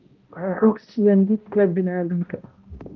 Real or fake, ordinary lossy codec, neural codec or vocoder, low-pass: fake; Opus, 32 kbps; codec, 16 kHz, 0.5 kbps, X-Codec, HuBERT features, trained on balanced general audio; 7.2 kHz